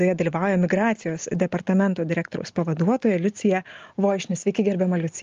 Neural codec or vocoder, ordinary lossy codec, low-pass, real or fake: none; Opus, 32 kbps; 7.2 kHz; real